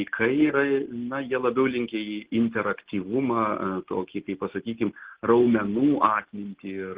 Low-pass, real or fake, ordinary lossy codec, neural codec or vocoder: 3.6 kHz; real; Opus, 16 kbps; none